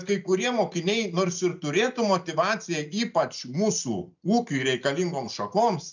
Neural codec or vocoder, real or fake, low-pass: none; real; 7.2 kHz